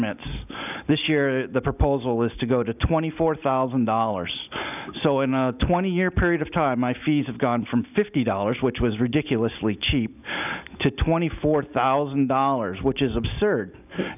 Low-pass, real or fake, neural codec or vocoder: 3.6 kHz; real; none